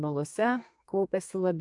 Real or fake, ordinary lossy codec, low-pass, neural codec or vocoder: fake; AAC, 64 kbps; 10.8 kHz; codec, 32 kHz, 1.9 kbps, SNAC